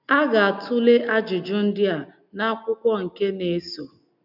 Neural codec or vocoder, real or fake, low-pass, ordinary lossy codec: none; real; 5.4 kHz; none